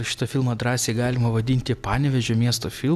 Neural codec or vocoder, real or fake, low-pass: none; real; 14.4 kHz